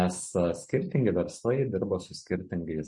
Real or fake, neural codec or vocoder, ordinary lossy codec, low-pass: real; none; MP3, 48 kbps; 10.8 kHz